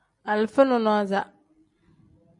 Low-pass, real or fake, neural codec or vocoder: 10.8 kHz; real; none